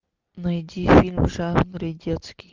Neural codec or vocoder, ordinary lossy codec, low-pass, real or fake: none; Opus, 24 kbps; 7.2 kHz; real